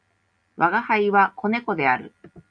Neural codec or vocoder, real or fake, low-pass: none; real; 9.9 kHz